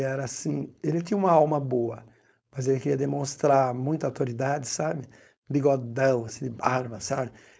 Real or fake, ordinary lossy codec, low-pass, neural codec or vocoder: fake; none; none; codec, 16 kHz, 4.8 kbps, FACodec